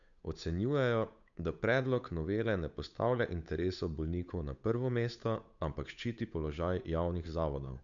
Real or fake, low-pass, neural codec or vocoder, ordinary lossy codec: fake; 7.2 kHz; codec, 16 kHz, 8 kbps, FunCodec, trained on Chinese and English, 25 frames a second; none